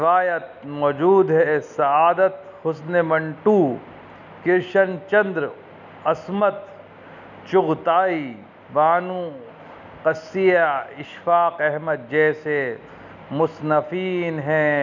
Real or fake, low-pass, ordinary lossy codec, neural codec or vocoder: real; 7.2 kHz; none; none